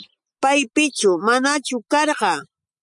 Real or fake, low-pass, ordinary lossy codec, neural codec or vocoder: real; 10.8 kHz; MP3, 96 kbps; none